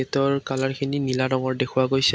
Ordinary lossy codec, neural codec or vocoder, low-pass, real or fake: none; none; none; real